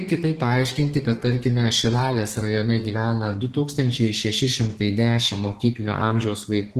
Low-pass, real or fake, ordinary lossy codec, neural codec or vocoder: 14.4 kHz; fake; Opus, 16 kbps; codec, 32 kHz, 1.9 kbps, SNAC